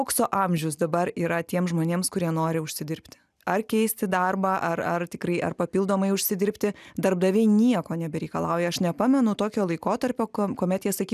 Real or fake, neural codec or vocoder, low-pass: real; none; 14.4 kHz